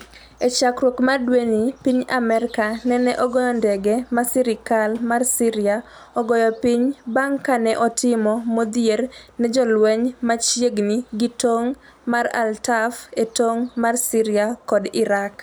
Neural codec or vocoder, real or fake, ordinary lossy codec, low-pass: none; real; none; none